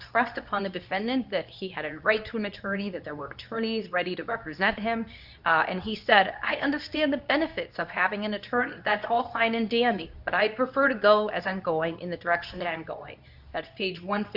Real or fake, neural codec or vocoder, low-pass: fake; codec, 24 kHz, 0.9 kbps, WavTokenizer, medium speech release version 2; 5.4 kHz